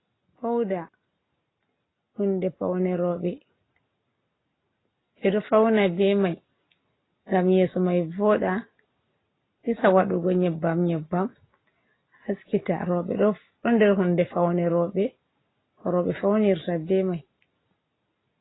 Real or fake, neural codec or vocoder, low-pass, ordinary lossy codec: real; none; 7.2 kHz; AAC, 16 kbps